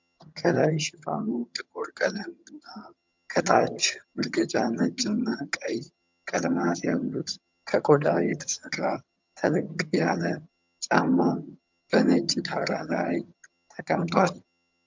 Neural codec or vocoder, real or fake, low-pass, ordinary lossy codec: vocoder, 22.05 kHz, 80 mel bands, HiFi-GAN; fake; 7.2 kHz; MP3, 64 kbps